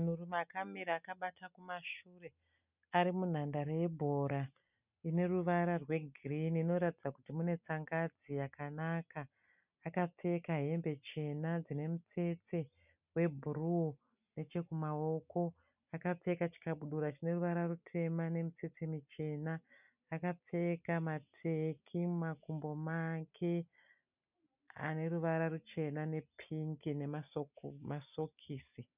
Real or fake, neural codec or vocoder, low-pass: real; none; 3.6 kHz